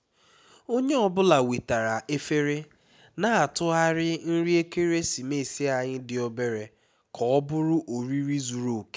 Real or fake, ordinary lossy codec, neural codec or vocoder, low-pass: real; none; none; none